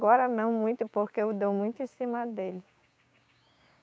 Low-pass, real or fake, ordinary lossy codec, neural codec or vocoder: none; fake; none; codec, 16 kHz, 6 kbps, DAC